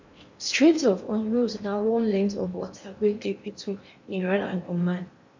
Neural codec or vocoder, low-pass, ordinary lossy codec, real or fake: codec, 16 kHz in and 24 kHz out, 0.8 kbps, FocalCodec, streaming, 65536 codes; 7.2 kHz; MP3, 48 kbps; fake